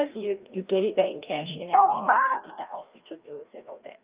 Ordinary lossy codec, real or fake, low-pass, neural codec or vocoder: Opus, 32 kbps; fake; 3.6 kHz; codec, 16 kHz, 1 kbps, FreqCodec, larger model